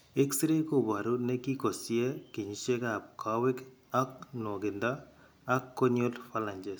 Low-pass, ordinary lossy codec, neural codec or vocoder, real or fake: none; none; none; real